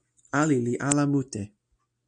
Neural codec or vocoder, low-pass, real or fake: none; 9.9 kHz; real